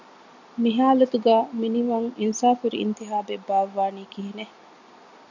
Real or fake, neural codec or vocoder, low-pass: real; none; 7.2 kHz